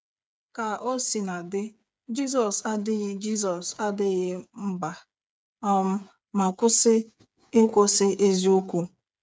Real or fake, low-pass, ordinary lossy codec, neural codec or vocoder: fake; none; none; codec, 16 kHz, 8 kbps, FreqCodec, smaller model